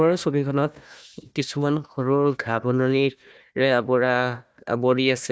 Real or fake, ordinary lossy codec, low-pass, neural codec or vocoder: fake; none; none; codec, 16 kHz, 1 kbps, FunCodec, trained on Chinese and English, 50 frames a second